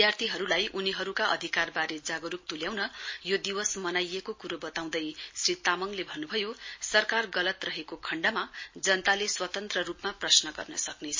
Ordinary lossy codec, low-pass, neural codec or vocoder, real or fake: MP3, 32 kbps; 7.2 kHz; none; real